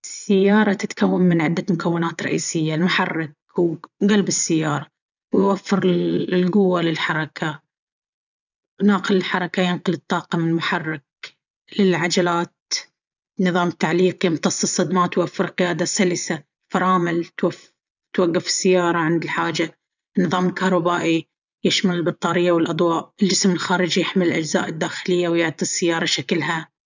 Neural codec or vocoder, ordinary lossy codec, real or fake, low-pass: vocoder, 22.05 kHz, 80 mel bands, Vocos; none; fake; 7.2 kHz